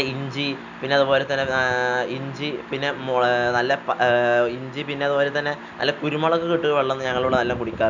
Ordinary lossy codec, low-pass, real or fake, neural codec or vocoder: none; 7.2 kHz; real; none